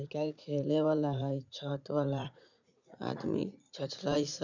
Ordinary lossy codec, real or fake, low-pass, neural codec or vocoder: none; fake; 7.2 kHz; vocoder, 44.1 kHz, 80 mel bands, Vocos